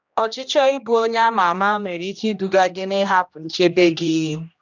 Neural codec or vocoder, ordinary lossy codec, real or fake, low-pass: codec, 16 kHz, 1 kbps, X-Codec, HuBERT features, trained on general audio; none; fake; 7.2 kHz